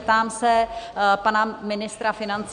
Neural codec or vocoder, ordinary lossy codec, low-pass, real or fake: none; AAC, 96 kbps; 9.9 kHz; real